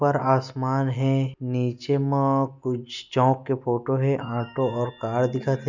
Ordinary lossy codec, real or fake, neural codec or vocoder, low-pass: none; real; none; 7.2 kHz